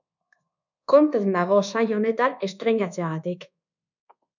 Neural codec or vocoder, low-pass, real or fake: codec, 24 kHz, 1.2 kbps, DualCodec; 7.2 kHz; fake